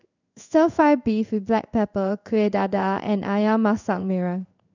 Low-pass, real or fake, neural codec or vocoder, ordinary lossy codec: 7.2 kHz; fake; codec, 16 kHz in and 24 kHz out, 1 kbps, XY-Tokenizer; none